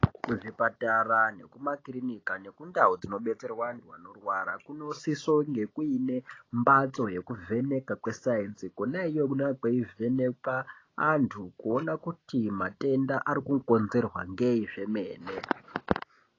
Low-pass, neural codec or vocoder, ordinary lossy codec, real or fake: 7.2 kHz; none; AAC, 32 kbps; real